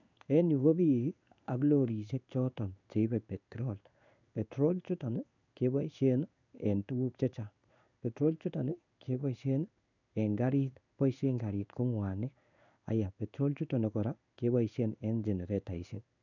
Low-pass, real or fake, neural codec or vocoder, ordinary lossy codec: 7.2 kHz; fake; codec, 16 kHz in and 24 kHz out, 1 kbps, XY-Tokenizer; none